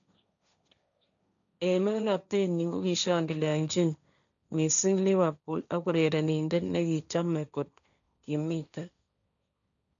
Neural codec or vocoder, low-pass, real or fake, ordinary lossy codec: codec, 16 kHz, 1.1 kbps, Voila-Tokenizer; 7.2 kHz; fake; none